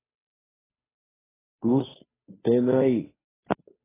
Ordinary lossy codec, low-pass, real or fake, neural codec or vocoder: AAC, 16 kbps; 3.6 kHz; fake; codec, 16 kHz, 2 kbps, FunCodec, trained on Chinese and English, 25 frames a second